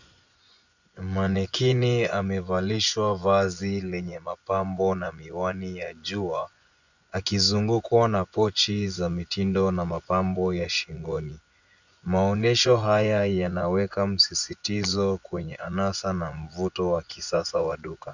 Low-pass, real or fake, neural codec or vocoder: 7.2 kHz; fake; vocoder, 24 kHz, 100 mel bands, Vocos